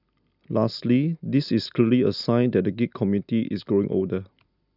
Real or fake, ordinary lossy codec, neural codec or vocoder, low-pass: real; none; none; 5.4 kHz